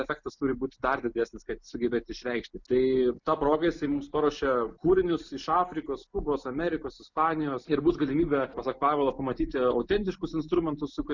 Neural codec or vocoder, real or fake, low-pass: none; real; 7.2 kHz